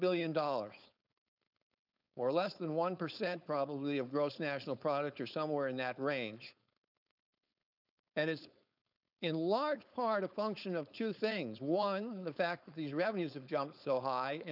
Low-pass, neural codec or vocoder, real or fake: 5.4 kHz; codec, 16 kHz, 4.8 kbps, FACodec; fake